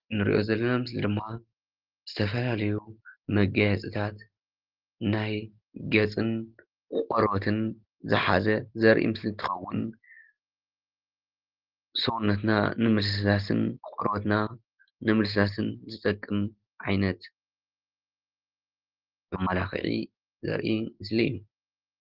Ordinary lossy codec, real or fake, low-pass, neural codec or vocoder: Opus, 16 kbps; real; 5.4 kHz; none